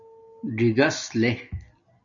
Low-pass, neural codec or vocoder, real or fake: 7.2 kHz; none; real